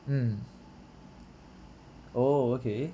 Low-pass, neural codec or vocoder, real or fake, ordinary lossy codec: none; none; real; none